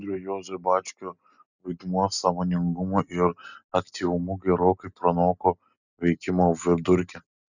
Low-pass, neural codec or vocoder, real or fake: 7.2 kHz; none; real